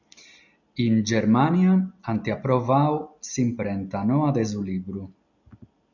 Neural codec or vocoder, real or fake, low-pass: none; real; 7.2 kHz